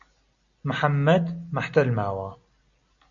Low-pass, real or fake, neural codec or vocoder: 7.2 kHz; real; none